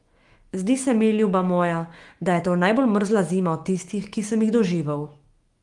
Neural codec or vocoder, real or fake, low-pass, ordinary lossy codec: autoencoder, 48 kHz, 128 numbers a frame, DAC-VAE, trained on Japanese speech; fake; 10.8 kHz; Opus, 24 kbps